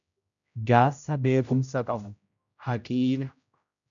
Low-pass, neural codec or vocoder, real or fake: 7.2 kHz; codec, 16 kHz, 0.5 kbps, X-Codec, HuBERT features, trained on general audio; fake